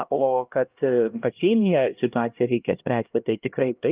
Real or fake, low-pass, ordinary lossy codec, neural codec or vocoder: fake; 3.6 kHz; Opus, 24 kbps; codec, 16 kHz, 1 kbps, X-Codec, HuBERT features, trained on LibriSpeech